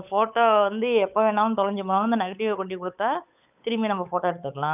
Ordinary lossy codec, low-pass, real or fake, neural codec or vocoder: none; 3.6 kHz; fake; codec, 16 kHz, 8 kbps, FunCodec, trained on Chinese and English, 25 frames a second